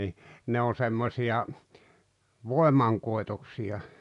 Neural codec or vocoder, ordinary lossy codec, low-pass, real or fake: none; none; 10.8 kHz; real